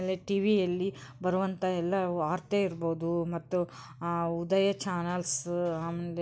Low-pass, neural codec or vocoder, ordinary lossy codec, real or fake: none; none; none; real